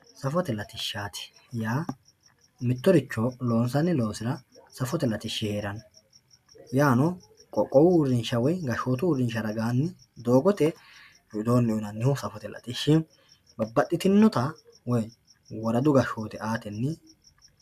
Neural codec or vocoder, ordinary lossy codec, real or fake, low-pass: none; MP3, 96 kbps; real; 14.4 kHz